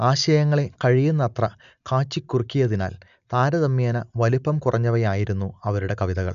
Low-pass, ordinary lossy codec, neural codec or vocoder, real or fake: 7.2 kHz; none; none; real